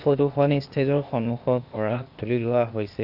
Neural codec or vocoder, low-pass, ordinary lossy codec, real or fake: codec, 16 kHz, 0.8 kbps, ZipCodec; 5.4 kHz; AAC, 48 kbps; fake